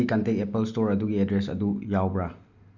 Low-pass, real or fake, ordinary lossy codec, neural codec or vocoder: 7.2 kHz; real; none; none